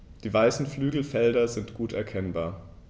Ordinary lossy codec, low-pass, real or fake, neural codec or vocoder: none; none; real; none